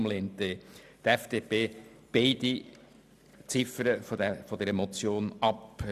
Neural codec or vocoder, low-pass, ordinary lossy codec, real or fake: none; 14.4 kHz; none; real